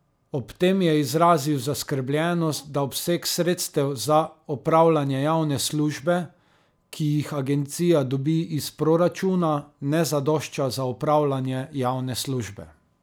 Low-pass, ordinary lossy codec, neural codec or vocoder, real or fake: none; none; none; real